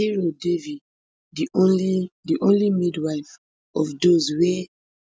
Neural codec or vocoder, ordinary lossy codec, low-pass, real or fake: none; none; none; real